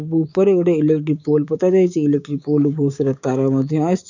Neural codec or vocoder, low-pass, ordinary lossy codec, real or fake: codec, 16 kHz, 6 kbps, DAC; 7.2 kHz; none; fake